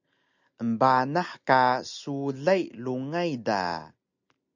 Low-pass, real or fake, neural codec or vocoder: 7.2 kHz; real; none